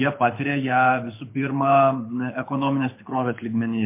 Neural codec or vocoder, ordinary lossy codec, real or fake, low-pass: codec, 24 kHz, 6 kbps, HILCodec; MP3, 24 kbps; fake; 3.6 kHz